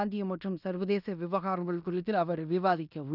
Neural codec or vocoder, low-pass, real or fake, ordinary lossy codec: codec, 16 kHz in and 24 kHz out, 0.9 kbps, LongCat-Audio-Codec, fine tuned four codebook decoder; 5.4 kHz; fake; none